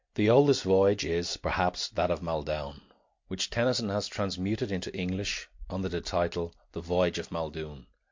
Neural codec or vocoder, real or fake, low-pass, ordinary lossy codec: none; real; 7.2 kHz; MP3, 48 kbps